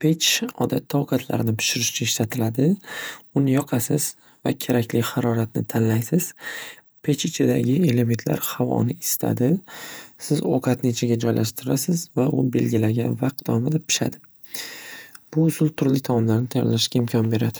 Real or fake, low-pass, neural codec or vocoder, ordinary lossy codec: fake; none; vocoder, 48 kHz, 128 mel bands, Vocos; none